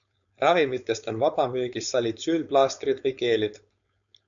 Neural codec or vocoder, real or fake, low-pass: codec, 16 kHz, 4.8 kbps, FACodec; fake; 7.2 kHz